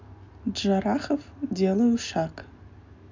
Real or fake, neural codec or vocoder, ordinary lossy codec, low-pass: real; none; MP3, 64 kbps; 7.2 kHz